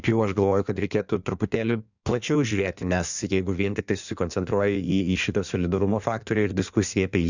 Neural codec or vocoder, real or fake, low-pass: codec, 16 kHz in and 24 kHz out, 1.1 kbps, FireRedTTS-2 codec; fake; 7.2 kHz